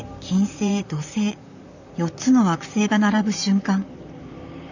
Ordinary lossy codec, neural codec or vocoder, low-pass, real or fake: none; vocoder, 44.1 kHz, 128 mel bands, Pupu-Vocoder; 7.2 kHz; fake